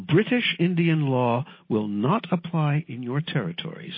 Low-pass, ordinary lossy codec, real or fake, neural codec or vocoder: 5.4 kHz; MP3, 24 kbps; real; none